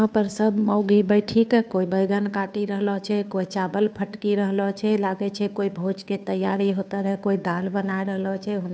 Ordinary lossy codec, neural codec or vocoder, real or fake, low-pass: none; codec, 16 kHz, 2 kbps, FunCodec, trained on Chinese and English, 25 frames a second; fake; none